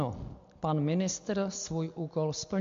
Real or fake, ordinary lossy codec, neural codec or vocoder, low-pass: real; MP3, 48 kbps; none; 7.2 kHz